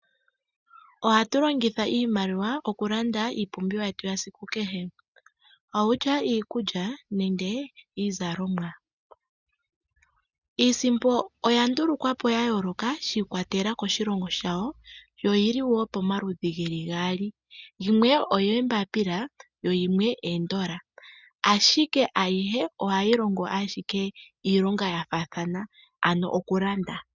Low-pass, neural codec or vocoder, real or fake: 7.2 kHz; none; real